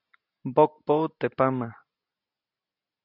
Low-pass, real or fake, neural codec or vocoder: 5.4 kHz; real; none